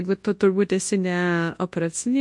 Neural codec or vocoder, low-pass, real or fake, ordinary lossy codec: codec, 24 kHz, 0.9 kbps, WavTokenizer, large speech release; 10.8 kHz; fake; MP3, 48 kbps